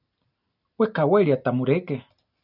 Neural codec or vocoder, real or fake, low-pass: none; real; 5.4 kHz